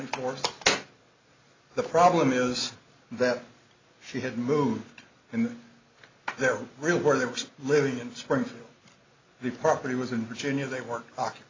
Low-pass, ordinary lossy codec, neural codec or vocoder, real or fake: 7.2 kHz; AAC, 32 kbps; none; real